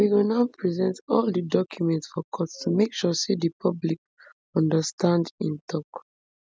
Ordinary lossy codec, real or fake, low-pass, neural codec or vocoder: none; real; none; none